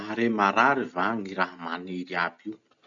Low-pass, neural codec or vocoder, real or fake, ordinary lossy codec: 7.2 kHz; none; real; none